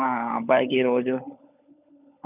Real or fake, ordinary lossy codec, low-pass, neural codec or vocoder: fake; none; 3.6 kHz; codec, 16 kHz, 16 kbps, FunCodec, trained on LibriTTS, 50 frames a second